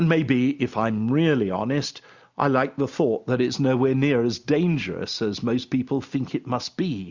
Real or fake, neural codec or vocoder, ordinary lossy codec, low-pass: real; none; Opus, 64 kbps; 7.2 kHz